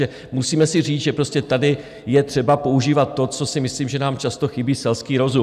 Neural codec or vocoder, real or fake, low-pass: none; real; 14.4 kHz